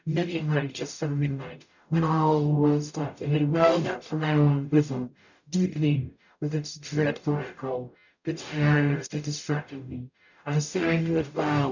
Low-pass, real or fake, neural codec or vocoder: 7.2 kHz; fake; codec, 44.1 kHz, 0.9 kbps, DAC